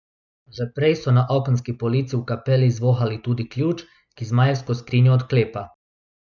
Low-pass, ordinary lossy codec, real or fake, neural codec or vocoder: 7.2 kHz; none; real; none